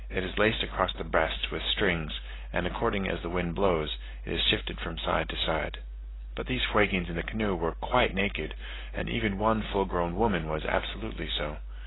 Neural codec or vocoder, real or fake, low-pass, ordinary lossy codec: none; real; 7.2 kHz; AAC, 16 kbps